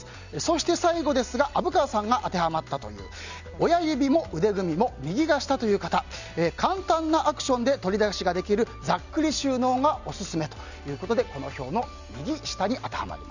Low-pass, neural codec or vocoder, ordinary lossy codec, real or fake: 7.2 kHz; none; none; real